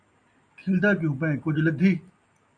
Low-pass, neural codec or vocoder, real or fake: 9.9 kHz; none; real